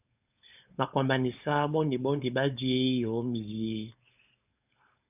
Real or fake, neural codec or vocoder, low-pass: fake; codec, 16 kHz, 4.8 kbps, FACodec; 3.6 kHz